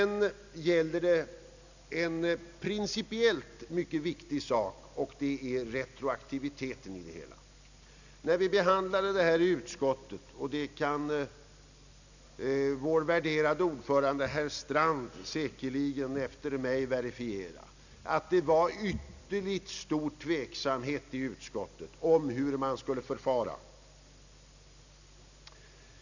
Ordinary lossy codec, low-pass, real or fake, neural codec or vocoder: none; 7.2 kHz; real; none